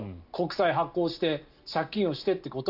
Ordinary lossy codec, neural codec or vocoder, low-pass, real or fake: none; none; 5.4 kHz; real